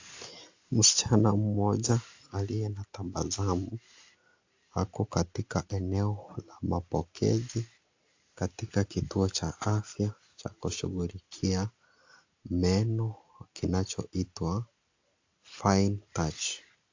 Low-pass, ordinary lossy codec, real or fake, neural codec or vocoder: 7.2 kHz; AAC, 48 kbps; real; none